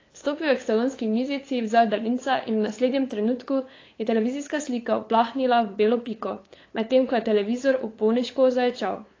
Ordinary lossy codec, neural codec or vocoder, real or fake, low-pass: AAC, 32 kbps; codec, 16 kHz, 8 kbps, FunCodec, trained on LibriTTS, 25 frames a second; fake; 7.2 kHz